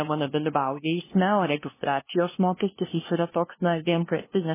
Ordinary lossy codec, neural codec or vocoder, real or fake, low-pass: MP3, 16 kbps; codec, 16 kHz, 0.5 kbps, FunCodec, trained on LibriTTS, 25 frames a second; fake; 3.6 kHz